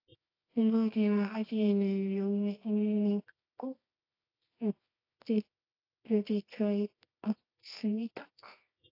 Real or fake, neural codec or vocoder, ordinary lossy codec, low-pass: fake; codec, 24 kHz, 0.9 kbps, WavTokenizer, medium music audio release; AAC, 32 kbps; 5.4 kHz